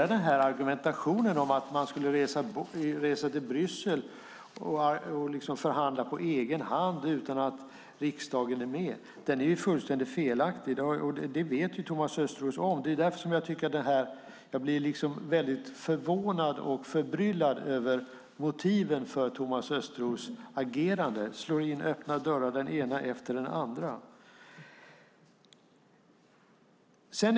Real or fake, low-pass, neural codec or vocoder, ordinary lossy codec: real; none; none; none